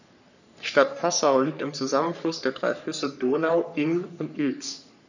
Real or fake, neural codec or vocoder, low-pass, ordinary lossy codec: fake; codec, 44.1 kHz, 3.4 kbps, Pupu-Codec; 7.2 kHz; none